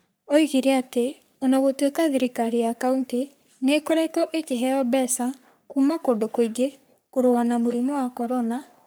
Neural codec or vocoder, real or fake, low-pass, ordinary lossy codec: codec, 44.1 kHz, 3.4 kbps, Pupu-Codec; fake; none; none